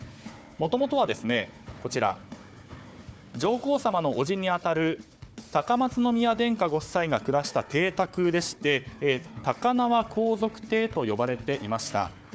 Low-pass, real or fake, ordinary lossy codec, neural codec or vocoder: none; fake; none; codec, 16 kHz, 4 kbps, FunCodec, trained on Chinese and English, 50 frames a second